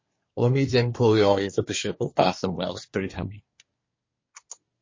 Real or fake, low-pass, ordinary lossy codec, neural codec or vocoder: fake; 7.2 kHz; MP3, 32 kbps; codec, 24 kHz, 1 kbps, SNAC